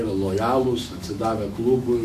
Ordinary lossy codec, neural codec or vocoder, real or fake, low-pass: MP3, 64 kbps; autoencoder, 48 kHz, 128 numbers a frame, DAC-VAE, trained on Japanese speech; fake; 14.4 kHz